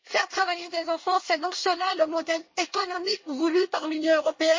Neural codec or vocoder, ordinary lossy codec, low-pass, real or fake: codec, 24 kHz, 1 kbps, SNAC; MP3, 32 kbps; 7.2 kHz; fake